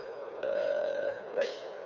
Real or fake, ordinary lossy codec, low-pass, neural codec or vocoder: fake; none; 7.2 kHz; codec, 16 kHz, 4 kbps, FreqCodec, smaller model